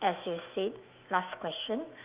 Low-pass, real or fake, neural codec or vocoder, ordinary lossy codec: 3.6 kHz; fake; autoencoder, 48 kHz, 128 numbers a frame, DAC-VAE, trained on Japanese speech; Opus, 24 kbps